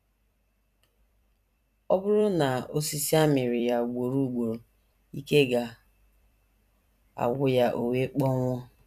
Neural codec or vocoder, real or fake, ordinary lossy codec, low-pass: vocoder, 44.1 kHz, 128 mel bands every 256 samples, BigVGAN v2; fake; none; 14.4 kHz